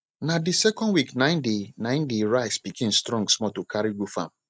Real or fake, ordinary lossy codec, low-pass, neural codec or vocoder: real; none; none; none